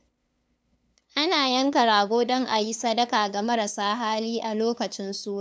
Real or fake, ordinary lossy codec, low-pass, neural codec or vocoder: fake; none; none; codec, 16 kHz, 2 kbps, FunCodec, trained on LibriTTS, 25 frames a second